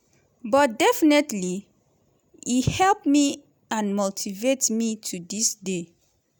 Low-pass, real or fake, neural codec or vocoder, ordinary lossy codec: none; real; none; none